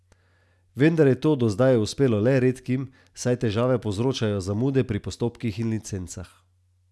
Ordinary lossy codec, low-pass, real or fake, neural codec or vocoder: none; none; real; none